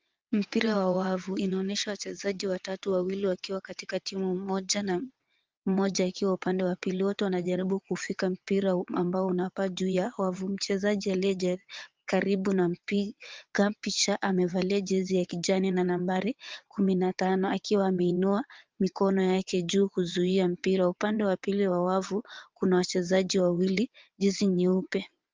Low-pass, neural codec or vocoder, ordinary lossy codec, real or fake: 7.2 kHz; vocoder, 22.05 kHz, 80 mel bands, WaveNeXt; Opus, 24 kbps; fake